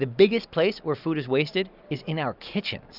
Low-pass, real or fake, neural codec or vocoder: 5.4 kHz; real; none